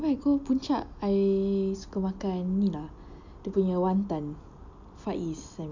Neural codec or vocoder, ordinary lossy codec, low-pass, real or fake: none; none; 7.2 kHz; real